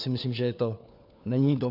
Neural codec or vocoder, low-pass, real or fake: codec, 16 kHz, 4 kbps, FunCodec, trained on LibriTTS, 50 frames a second; 5.4 kHz; fake